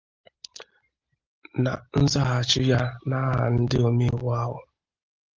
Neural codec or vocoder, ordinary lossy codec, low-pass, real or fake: none; Opus, 32 kbps; 7.2 kHz; real